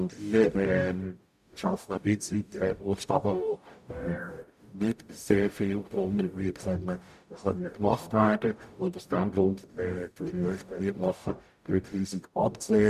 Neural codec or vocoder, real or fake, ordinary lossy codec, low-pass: codec, 44.1 kHz, 0.9 kbps, DAC; fake; none; 14.4 kHz